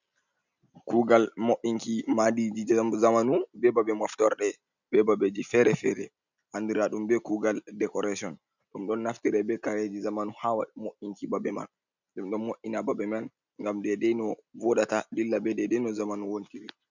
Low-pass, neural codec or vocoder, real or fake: 7.2 kHz; none; real